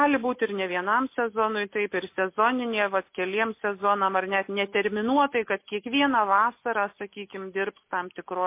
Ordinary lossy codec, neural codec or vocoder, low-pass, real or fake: MP3, 24 kbps; none; 3.6 kHz; real